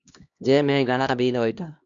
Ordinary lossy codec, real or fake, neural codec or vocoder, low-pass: Opus, 64 kbps; fake; codec, 16 kHz, 1 kbps, X-Codec, HuBERT features, trained on LibriSpeech; 7.2 kHz